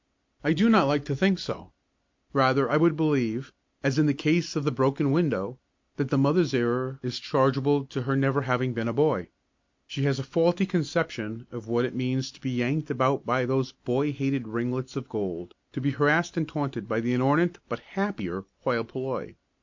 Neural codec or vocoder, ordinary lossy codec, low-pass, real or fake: none; MP3, 48 kbps; 7.2 kHz; real